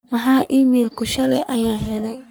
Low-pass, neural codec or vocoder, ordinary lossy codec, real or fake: none; codec, 44.1 kHz, 3.4 kbps, Pupu-Codec; none; fake